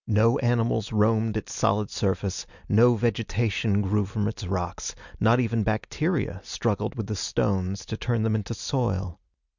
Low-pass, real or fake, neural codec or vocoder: 7.2 kHz; real; none